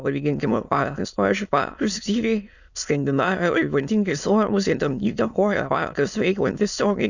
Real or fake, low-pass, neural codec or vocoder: fake; 7.2 kHz; autoencoder, 22.05 kHz, a latent of 192 numbers a frame, VITS, trained on many speakers